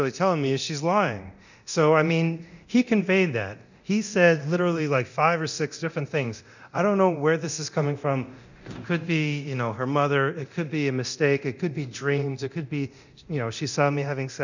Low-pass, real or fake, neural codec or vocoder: 7.2 kHz; fake; codec, 24 kHz, 0.9 kbps, DualCodec